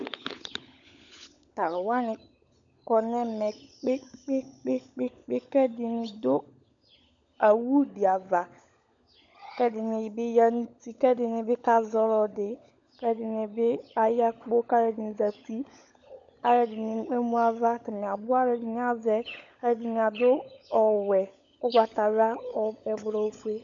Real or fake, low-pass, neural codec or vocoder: fake; 7.2 kHz; codec, 16 kHz, 16 kbps, FunCodec, trained on LibriTTS, 50 frames a second